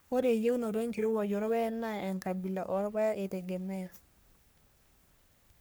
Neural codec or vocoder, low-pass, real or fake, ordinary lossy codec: codec, 44.1 kHz, 3.4 kbps, Pupu-Codec; none; fake; none